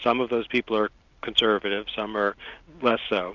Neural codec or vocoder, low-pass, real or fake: none; 7.2 kHz; real